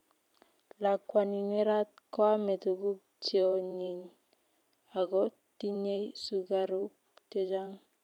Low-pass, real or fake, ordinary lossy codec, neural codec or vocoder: 19.8 kHz; fake; none; vocoder, 44.1 kHz, 128 mel bands every 512 samples, BigVGAN v2